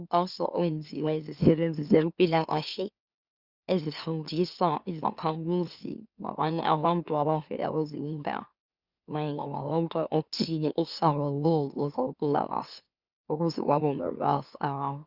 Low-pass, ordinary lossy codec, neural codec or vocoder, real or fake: 5.4 kHz; Opus, 64 kbps; autoencoder, 44.1 kHz, a latent of 192 numbers a frame, MeloTTS; fake